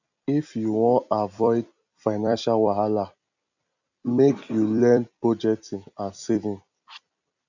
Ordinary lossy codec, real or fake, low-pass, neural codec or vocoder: none; fake; 7.2 kHz; vocoder, 44.1 kHz, 128 mel bands every 256 samples, BigVGAN v2